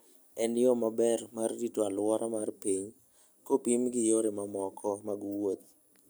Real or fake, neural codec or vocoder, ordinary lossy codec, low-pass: real; none; none; none